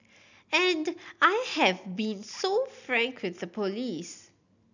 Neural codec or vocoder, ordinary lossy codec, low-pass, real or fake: none; none; 7.2 kHz; real